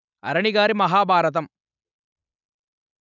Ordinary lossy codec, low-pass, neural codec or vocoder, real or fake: none; 7.2 kHz; none; real